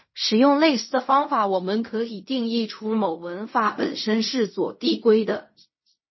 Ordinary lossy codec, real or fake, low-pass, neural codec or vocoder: MP3, 24 kbps; fake; 7.2 kHz; codec, 16 kHz in and 24 kHz out, 0.4 kbps, LongCat-Audio-Codec, fine tuned four codebook decoder